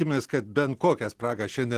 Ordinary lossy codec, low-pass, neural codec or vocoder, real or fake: Opus, 16 kbps; 14.4 kHz; none; real